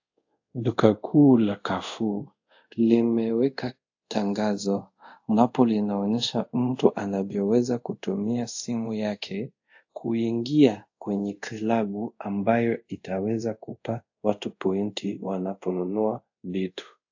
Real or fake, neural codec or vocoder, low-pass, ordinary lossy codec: fake; codec, 24 kHz, 0.5 kbps, DualCodec; 7.2 kHz; AAC, 48 kbps